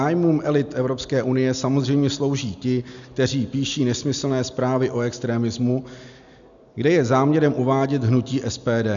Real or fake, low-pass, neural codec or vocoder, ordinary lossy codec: real; 7.2 kHz; none; MP3, 96 kbps